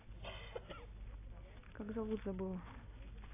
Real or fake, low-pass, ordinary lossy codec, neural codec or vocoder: real; 3.6 kHz; AAC, 16 kbps; none